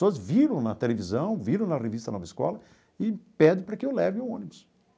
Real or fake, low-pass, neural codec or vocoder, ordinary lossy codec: real; none; none; none